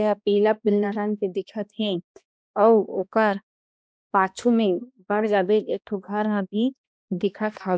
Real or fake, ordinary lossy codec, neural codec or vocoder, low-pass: fake; none; codec, 16 kHz, 1 kbps, X-Codec, HuBERT features, trained on balanced general audio; none